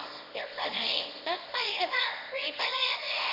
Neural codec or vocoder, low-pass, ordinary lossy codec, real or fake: codec, 24 kHz, 0.9 kbps, WavTokenizer, small release; 5.4 kHz; none; fake